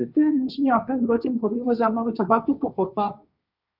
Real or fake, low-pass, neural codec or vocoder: fake; 5.4 kHz; codec, 16 kHz, 1.1 kbps, Voila-Tokenizer